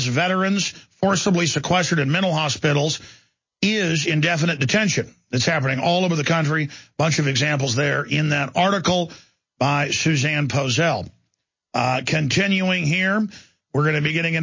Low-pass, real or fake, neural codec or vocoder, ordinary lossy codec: 7.2 kHz; real; none; MP3, 32 kbps